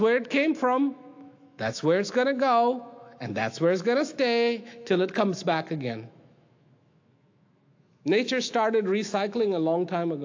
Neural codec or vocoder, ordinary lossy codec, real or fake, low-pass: autoencoder, 48 kHz, 128 numbers a frame, DAC-VAE, trained on Japanese speech; AAC, 48 kbps; fake; 7.2 kHz